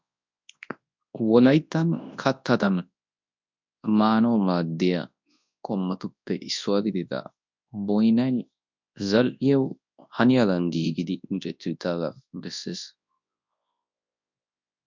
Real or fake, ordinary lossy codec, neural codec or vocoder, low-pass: fake; MP3, 64 kbps; codec, 24 kHz, 0.9 kbps, WavTokenizer, large speech release; 7.2 kHz